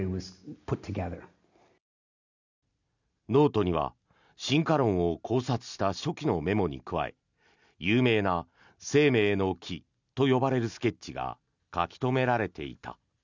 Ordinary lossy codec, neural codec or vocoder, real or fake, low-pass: none; none; real; 7.2 kHz